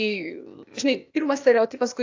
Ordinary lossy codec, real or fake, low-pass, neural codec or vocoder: AAC, 48 kbps; fake; 7.2 kHz; codec, 16 kHz, 0.8 kbps, ZipCodec